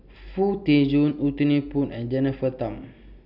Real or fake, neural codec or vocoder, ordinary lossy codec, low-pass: real; none; none; 5.4 kHz